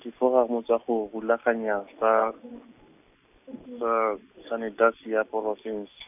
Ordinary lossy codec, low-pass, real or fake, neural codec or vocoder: none; 3.6 kHz; real; none